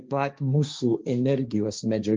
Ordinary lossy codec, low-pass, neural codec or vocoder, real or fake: Opus, 32 kbps; 7.2 kHz; codec, 16 kHz, 1.1 kbps, Voila-Tokenizer; fake